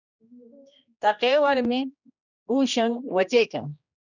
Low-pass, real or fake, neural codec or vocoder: 7.2 kHz; fake; codec, 16 kHz, 1 kbps, X-Codec, HuBERT features, trained on general audio